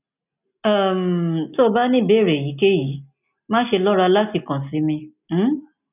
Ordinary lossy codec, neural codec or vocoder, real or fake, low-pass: none; none; real; 3.6 kHz